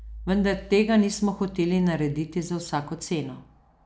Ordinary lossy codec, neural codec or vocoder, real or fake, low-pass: none; none; real; none